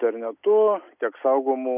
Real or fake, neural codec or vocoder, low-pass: real; none; 3.6 kHz